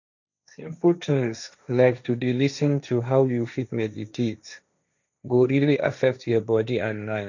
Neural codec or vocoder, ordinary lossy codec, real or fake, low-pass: codec, 16 kHz, 1.1 kbps, Voila-Tokenizer; none; fake; 7.2 kHz